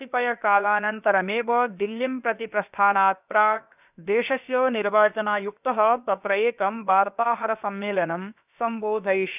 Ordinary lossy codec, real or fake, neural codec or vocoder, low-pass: none; fake; codec, 16 kHz, about 1 kbps, DyCAST, with the encoder's durations; 3.6 kHz